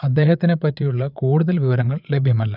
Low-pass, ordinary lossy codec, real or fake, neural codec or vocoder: 5.4 kHz; none; fake; vocoder, 22.05 kHz, 80 mel bands, Vocos